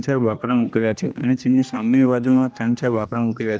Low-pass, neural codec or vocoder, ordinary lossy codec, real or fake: none; codec, 16 kHz, 1 kbps, X-Codec, HuBERT features, trained on general audio; none; fake